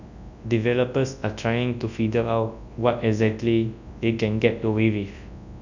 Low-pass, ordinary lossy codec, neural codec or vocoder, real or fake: 7.2 kHz; none; codec, 24 kHz, 0.9 kbps, WavTokenizer, large speech release; fake